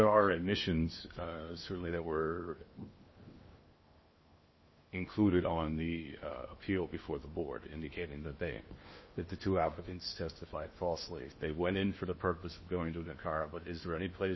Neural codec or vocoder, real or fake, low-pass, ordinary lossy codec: codec, 16 kHz in and 24 kHz out, 0.8 kbps, FocalCodec, streaming, 65536 codes; fake; 7.2 kHz; MP3, 24 kbps